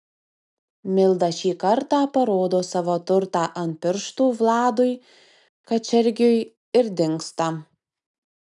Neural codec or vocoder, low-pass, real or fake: none; 10.8 kHz; real